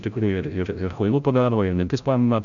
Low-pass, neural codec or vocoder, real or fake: 7.2 kHz; codec, 16 kHz, 0.5 kbps, FreqCodec, larger model; fake